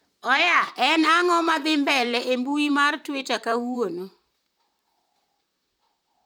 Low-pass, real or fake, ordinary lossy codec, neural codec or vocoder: none; fake; none; vocoder, 44.1 kHz, 128 mel bands, Pupu-Vocoder